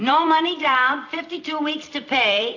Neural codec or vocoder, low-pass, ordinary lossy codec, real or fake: vocoder, 44.1 kHz, 128 mel bands every 256 samples, BigVGAN v2; 7.2 kHz; AAC, 48 kbps; fake